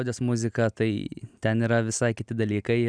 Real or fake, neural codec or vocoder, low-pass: real; none; 9.9 kHz